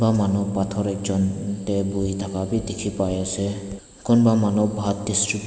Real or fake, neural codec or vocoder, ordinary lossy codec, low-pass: real; none; none; none